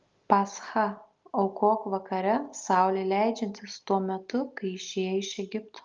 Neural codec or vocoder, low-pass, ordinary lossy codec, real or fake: none; 7.2 kHz; Opus, 32 kbps; real